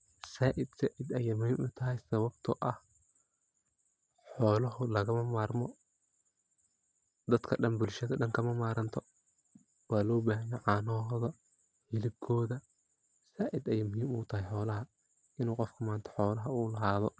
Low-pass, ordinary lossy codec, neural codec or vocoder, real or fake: none; none; none; real